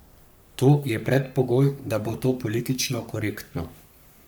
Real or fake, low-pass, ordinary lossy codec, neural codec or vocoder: fake; none; none; codec, 44.1 kHz, 3.4 kbps, Pupu-Codec